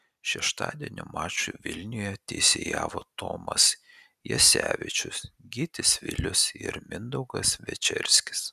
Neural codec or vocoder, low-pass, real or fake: none; 14.4 kHz; real